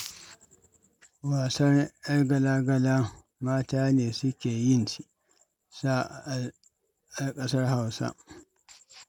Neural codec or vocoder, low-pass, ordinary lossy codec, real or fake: none; 19.8 kHz; none; real